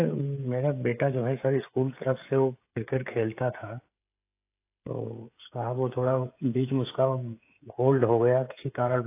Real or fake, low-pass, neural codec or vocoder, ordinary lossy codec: fake; 3.6 kHz; codec, 16 kHz, 16 kbps, FreqCodec, smaller model; AAC, 24 kbps